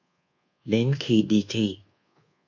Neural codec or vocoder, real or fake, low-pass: codec, 24 kHz, 1.2 kbps, DualCodec; fake; 7.2 kHz